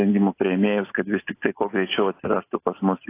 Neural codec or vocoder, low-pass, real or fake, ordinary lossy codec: none; 3.6 kHz; real; AAC, 24 kbps